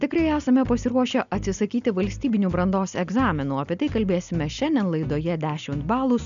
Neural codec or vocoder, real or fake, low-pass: none; real; 7.2 kHz